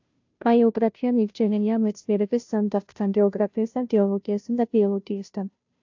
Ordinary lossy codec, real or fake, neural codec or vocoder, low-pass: AAC, 48 kbps; fake; codec, 16 kHz, 0.5 kbps, FunCodec, trained on Chinese and English, 25 frames a second; 7.2 kHz